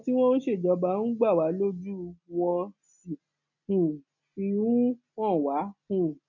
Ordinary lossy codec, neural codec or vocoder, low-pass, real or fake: none; none; 7.2 kHz; real